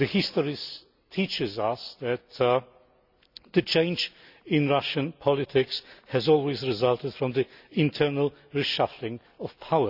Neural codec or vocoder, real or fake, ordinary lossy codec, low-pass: none; real; none; 5.4 kHz